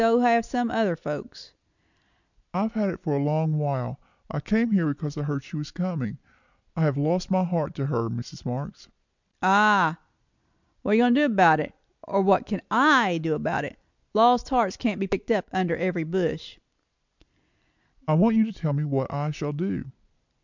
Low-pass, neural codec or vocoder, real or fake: 7.2 kHz; none; real